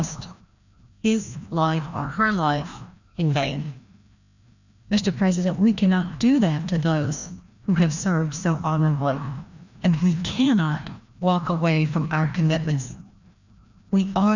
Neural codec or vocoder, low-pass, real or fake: codec, 16 kHz, 1 kbps, FreqCodec, larger model; 7.2 kHz; fake